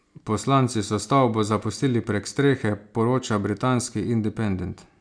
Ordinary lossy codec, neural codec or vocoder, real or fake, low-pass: none; none; real; 9.9 kHz